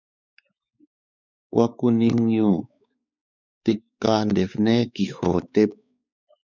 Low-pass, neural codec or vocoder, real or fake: 7.2 kHz; codec, 16 kHz, 4 kbps, X-Codec, WavLM features, trained on Multilingual LibriSpeech; fake